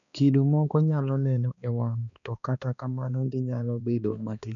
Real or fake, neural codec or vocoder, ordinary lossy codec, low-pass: fake; codec, 16 kHz, 2 kbps, X-Codec, HuBERT features, trained on balanced general audio; none; 7.2 kHz